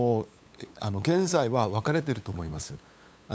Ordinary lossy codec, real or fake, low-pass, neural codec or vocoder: none; fake; none; codec, 16 kHz, 8 kbps, FunCodec, trained on LibriTTS, 25 frames a second